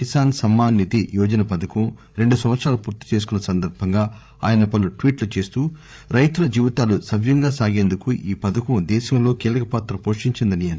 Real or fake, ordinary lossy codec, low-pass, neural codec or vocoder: fake; none; none; codec, 16 kHz, 8 kbps, FreqCodec, larger model